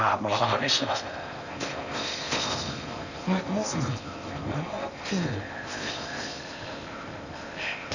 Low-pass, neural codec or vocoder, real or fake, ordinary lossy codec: 7.2 kHz; codec, 16 kHz in and 24 kHz out, 0.6 kbps, FocalCodec, streaming, 4096 codes; fake; none